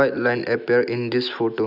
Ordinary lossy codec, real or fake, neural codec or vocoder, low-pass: none; real; none; 5.4 kHz